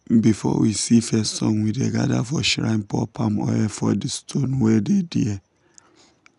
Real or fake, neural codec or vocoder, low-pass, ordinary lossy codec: real; none; 10.8 kHz; none